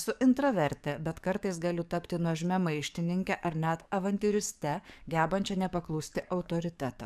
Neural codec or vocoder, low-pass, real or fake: codec, 44.1 kHz, 7.8 kbps, DAC; 14.4 kHz; fake